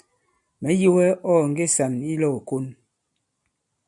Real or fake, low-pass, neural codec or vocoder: real; 10.8 kHz; none